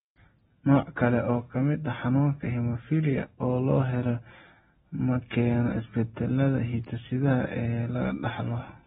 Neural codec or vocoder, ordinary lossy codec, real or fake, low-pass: none; AAC, 16 kbps; real; 19.8 kHz